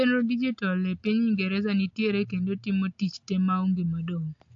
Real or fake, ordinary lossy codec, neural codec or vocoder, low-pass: real; AAC, 48 kbps; none; 7.2 kHz